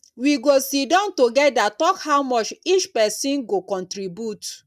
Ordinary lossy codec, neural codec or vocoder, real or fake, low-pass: none; none; real; 14.4 kHz